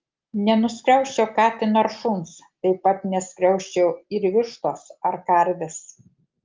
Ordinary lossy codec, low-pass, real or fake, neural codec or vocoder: Opus, 32 kbps; 7.2 kHz; real; none